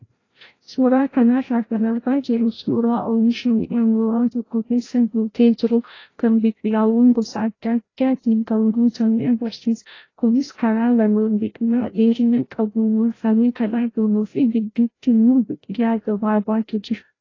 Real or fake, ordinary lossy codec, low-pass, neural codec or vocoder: fake; AAC, 32 kbps; 7.2 kHz; codec, 16 kHz, 0.5 kbps, FreqCodec, larger model